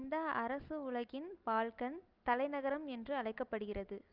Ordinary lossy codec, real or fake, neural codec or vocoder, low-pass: Opus, 24 kbps; real; none; 5.4 kHz